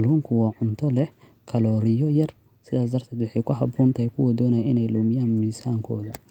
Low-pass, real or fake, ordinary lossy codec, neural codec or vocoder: 19.8 kHz; real; Opus, 32 kbps; none